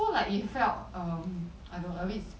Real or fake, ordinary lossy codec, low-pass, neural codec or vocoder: real; none; none; none